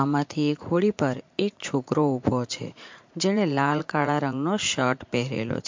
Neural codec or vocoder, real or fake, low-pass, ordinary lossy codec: vocoder, 44.1 kHz, 80 mel bands, Vocos; fake; 7.2 kHz; MP3, 48 kbps